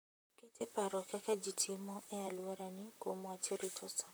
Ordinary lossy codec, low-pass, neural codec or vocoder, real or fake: none; none; vocoder, 44.1 kHz, 128 mel bands, Pupu-Vocoder; fake